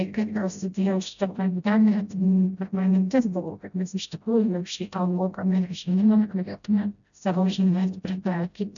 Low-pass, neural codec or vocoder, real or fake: 7.2 kHz; codec, 16 kHz, 0.5 kbps, FreqCodec, smaller model; fake